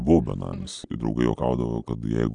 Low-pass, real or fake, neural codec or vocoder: 9.9 kHz; real; none